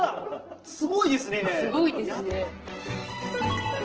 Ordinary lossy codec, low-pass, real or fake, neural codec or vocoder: Opus, 16 kbps; 7.2 kHz; real; none